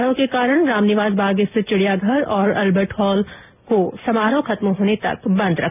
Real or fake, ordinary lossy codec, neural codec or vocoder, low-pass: real; none; none; 3.6 kHz